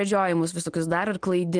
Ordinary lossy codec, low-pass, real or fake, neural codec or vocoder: Opus, 24 kbps; 9.9 kHz; real; none